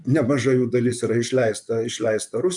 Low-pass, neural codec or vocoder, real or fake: 10.8 kHz; vocoder, 24 kHz, 100 mel bands, Vocos; fake